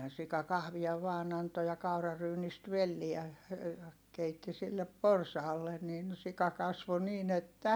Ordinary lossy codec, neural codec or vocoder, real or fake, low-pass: none; none; real; none